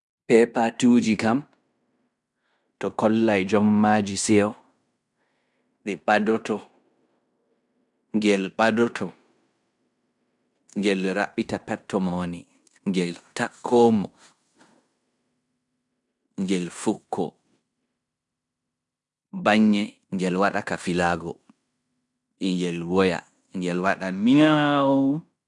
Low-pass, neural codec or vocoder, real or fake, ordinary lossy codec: 10.8 kHz; codec, 16 kHz in and 24 kHz out, 0.9 kbps, LongCat-Audio-Codec, fine tuned four codebook decoder; fake; none